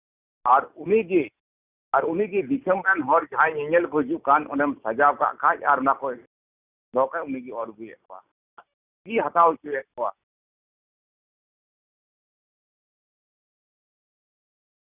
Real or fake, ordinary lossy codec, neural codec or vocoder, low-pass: real; Opus, 64 kbps; none; 3.6 kHz